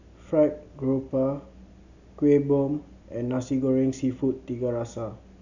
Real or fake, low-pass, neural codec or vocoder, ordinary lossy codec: real; 7.2 kHz; none; none